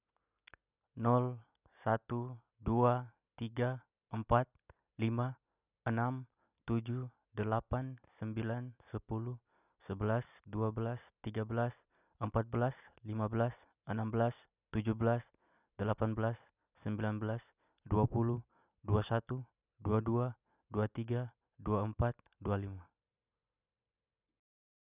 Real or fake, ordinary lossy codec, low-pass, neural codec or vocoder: real; none; 3.6 kHz; none